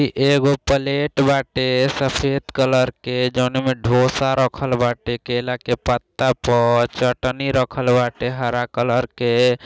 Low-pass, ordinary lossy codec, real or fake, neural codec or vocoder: none; none; real; none